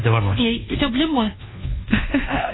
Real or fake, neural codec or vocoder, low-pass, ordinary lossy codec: fake; codec, 24 kHz, 1.2 kbps, DualCodec; 7.2 kHz; AAC, 16 kbps